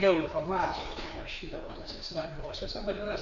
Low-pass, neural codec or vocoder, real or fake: 7.2 kHz; codec, 16 kHz, 2 kbps, FreqCodec, larger model; fake